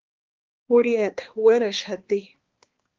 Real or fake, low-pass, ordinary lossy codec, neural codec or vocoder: fake; 7.2 kHz; Opus, 16 kbps; codec, 16 kHz, 2 kbps, X-Codec, HuBERT features, trained on balanced general audio